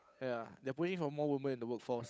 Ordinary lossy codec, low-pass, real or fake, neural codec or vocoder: none; none; fake; codec, 16 kHz, 8 kbps, FunCodec, trained on Chinese and English, 25 frames a second